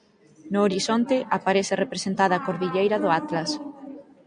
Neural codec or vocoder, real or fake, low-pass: none; real; 9.9 kHz